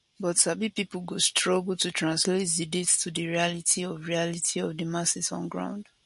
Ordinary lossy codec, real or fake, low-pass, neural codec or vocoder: MP3, 48 kbps; real; 14.4 kHz; none